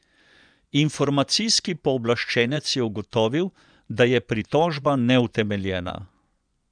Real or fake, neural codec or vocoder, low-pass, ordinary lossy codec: fake; vocoder, 24 kHz, 100 mel bands, Vocos; 9.9 kHz; none